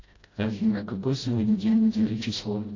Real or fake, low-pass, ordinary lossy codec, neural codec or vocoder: fake; 7.2 kHz; AAC, 32 kbps; codec, 16 kHz, 0.5 kbps, FreqCodec, smaller model